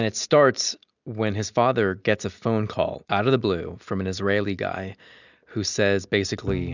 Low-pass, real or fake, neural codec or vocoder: 7.2 kHz; real; none